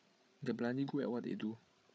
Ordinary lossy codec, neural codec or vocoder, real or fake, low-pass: none; codec, 16 kHz, 8 kbps, FreqCodec, larger model; fake; none